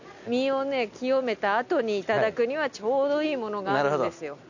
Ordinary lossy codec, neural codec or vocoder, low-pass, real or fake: none; none; 7.2 kHz; real